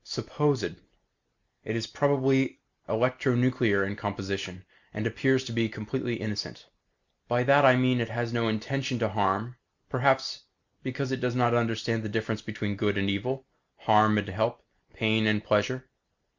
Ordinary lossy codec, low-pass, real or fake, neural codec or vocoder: Opus, 64 kbps; 7.2 kHz; real; none